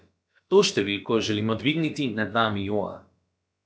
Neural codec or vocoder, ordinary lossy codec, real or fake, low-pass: codec, 16 kHz, about 1 kbps, DyCAST, with the encoder's durations; none; fake; none